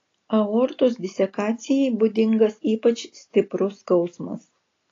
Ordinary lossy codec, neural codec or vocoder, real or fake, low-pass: AAC, 32 kbps; none; real; 7.2 kHz